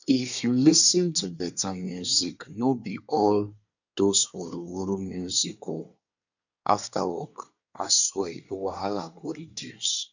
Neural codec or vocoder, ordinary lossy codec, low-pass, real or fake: codec, 24 kHz, 1 kbps, SNAC; none; 7.2 kHz; fake